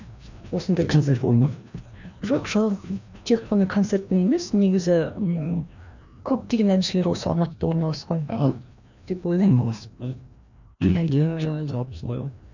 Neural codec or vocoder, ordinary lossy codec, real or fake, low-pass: codec, 16 kHz, 1 kbps, FreqCodec, larger model; none; fake; 7.2 kHz